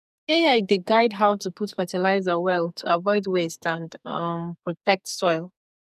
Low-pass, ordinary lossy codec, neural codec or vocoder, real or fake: 14.4 kHz; none; codec, 44.1 kHz, 2.6 kbps, SNAC; fake